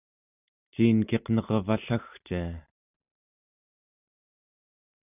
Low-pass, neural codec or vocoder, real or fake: 3.6 kHz; none; real